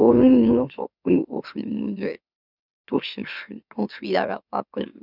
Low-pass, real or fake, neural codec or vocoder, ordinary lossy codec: 5.4 kHz; fake; autoencoder, 44.1 kHz, a latent of 192 numbers a frame, MeloTTS; none